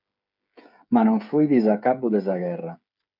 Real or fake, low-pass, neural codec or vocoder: fake; 5.4 kHz; codec, 16 kHz, 8 kbps, FreqCodec, smaller model